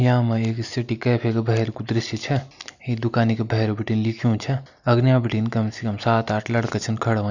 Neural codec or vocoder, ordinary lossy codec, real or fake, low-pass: none; none; real; 7.2 kHz